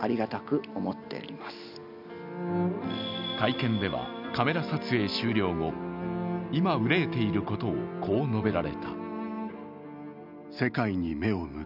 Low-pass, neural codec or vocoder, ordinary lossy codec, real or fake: 5.4 kHz; none; none; real